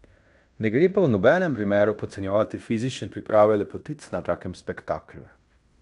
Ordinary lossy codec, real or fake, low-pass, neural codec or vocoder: none; fake; 10.8 kHz; codec, 16 kHz in and 24 kHz out, 0.9 kbps, LongCat-Audio-Codec, fine tuned four codebook decoder